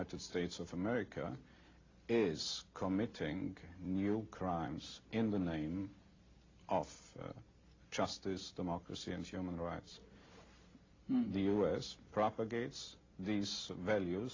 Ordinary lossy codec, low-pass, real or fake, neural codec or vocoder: AAC, 32 kbps; 7.2 kHz; real; none